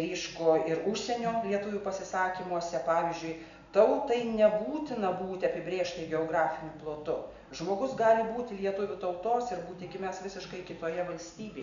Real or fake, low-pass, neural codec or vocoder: real; 7.2 kHz; none